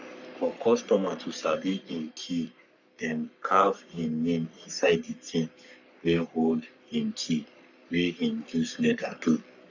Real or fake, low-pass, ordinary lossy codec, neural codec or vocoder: fake; 7.2 kHz; none; codec, 44.1 kHz, 3.4 kbps, Pupu-Codec